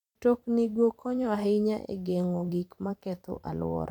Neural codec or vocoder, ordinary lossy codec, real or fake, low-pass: vocoder, 44.1 kHz, 128 mel bands every 512 samples, BigVGAN v2; none; fake; 19.8 kHz